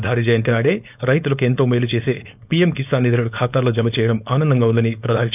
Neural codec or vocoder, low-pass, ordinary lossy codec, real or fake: codec, 16 kHz, 4.8 kbps, FACodec; 3.6 kHz; none; fake